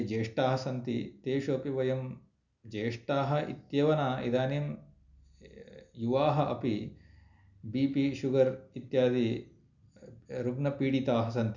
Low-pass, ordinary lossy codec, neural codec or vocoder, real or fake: 7.2 kHz; none; none; real